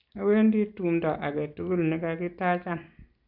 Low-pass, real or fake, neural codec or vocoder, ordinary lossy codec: 5.4 kHz; real; none; AAC, 48 kbps